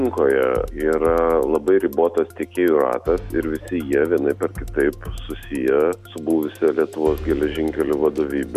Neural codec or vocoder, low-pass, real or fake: none; 14.4 kHz; real